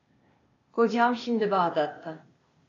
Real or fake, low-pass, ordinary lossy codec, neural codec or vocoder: fake; 7.2 kHz; AAC, 32 kbps; codec, 16 kHz, 0.8 kbps, ZipCodec